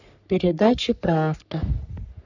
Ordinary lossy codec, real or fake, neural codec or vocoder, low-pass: none; fake; codec, 44.1 kHz, 3.4 kbps, Pupu-Codec; 7.2 kHz